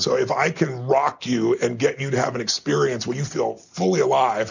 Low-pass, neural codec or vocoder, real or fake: 7.2 kHz; none; real